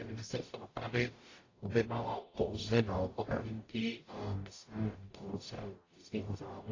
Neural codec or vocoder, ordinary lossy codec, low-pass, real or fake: codec, 44.1 kHz, 0.9 kbps, DAC; AAC, 32 kbps; 7.2 kHz; fake